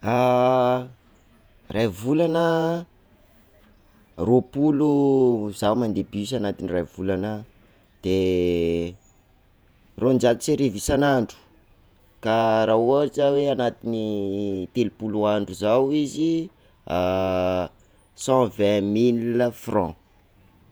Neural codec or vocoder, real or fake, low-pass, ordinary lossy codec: vocoder, 48 kHz, 128 mel bands, Vocos; fake; none; none